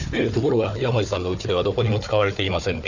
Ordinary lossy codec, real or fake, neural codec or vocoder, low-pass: none; fake; codec, 16 kHz, 4 kbps, FunCodec, trained on Chinese and English, 50 frames a second; 7.2 kHz